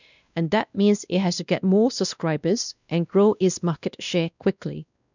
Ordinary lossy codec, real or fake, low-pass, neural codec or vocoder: none; fake; 7.2 kHz; codec, 16 kHz, 1 kbps, X-Codec, WavLM features, trained on Multilingual LibriSpeech